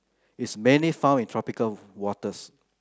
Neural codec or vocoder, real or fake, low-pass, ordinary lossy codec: none; real; none; none